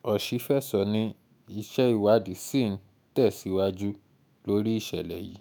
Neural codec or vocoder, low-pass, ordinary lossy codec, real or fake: autoencoder, 48 kHz, 128 numbers a frame, DAC-VAE, trained on Japanese speech; none; none; fake